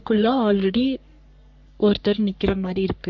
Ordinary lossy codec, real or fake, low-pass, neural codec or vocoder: MP3, 64 kbps; fake; 7.2 kHz; codec, 44.1 kHz, 3.4 kbps, Pupu-Codec